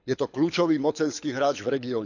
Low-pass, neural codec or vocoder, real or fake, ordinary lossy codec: 7.2 kHz; codec, 24 kHz, 6 kbps, HILCodec; fake; none